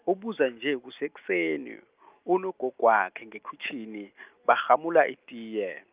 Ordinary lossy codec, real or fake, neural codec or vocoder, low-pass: Opus, 24 kbps; real; none; 3.6 kHz